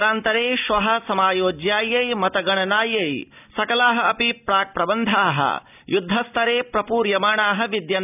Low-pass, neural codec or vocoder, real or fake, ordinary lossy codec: 3.6 kHz; none; real; none